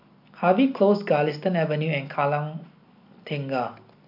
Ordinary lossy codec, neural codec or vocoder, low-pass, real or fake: MP3, 48 kbps; none; 5.4 kHz; real